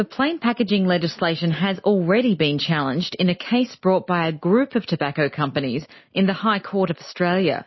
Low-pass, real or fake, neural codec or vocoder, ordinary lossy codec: 7.2 kHz; real; none; MP3, 24 kbps